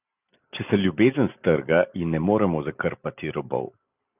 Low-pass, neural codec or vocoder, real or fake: 3.6 kHz; none; real